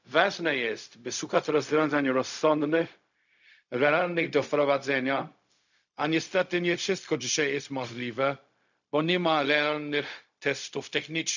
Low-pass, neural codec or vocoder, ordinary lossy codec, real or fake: 7.2 kHz; codec, 16 kHz, 0.4 kbps, LongCat-Audio-Codec; none; fake